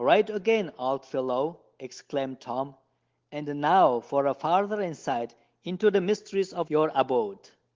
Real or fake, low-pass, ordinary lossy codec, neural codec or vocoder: real; 7.2 kHz; Opus, 16 kbps; none